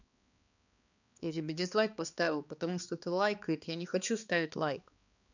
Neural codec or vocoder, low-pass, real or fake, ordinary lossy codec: codec, 16 kHz, 2 kbps, X-Codec, HuBERT features, trained on balanced general audio; 7.2 kHz; fake; none